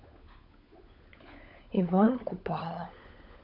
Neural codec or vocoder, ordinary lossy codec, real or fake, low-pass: codec, 16 kHz, 16 kbps, FunCodec, trained on LibriTTS, 50 frames a second; MP3, 48 kbps; fake; 5.4 kHz